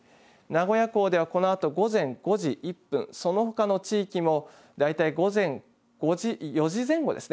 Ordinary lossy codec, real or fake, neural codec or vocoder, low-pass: none; real; none; none